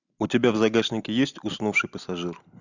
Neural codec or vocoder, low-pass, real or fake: none; 7.2 kHz; real